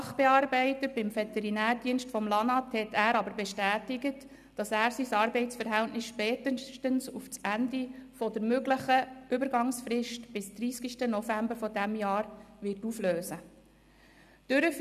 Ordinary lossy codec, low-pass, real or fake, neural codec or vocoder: none; 14.4 kHz; real; none